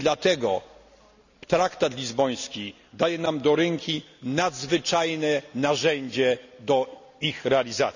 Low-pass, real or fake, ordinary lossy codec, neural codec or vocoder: 7.2 kHz; real; none; none